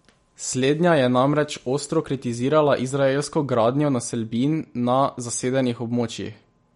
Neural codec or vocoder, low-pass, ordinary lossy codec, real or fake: none; 19.8 kHz; MP3, 48 kbps; real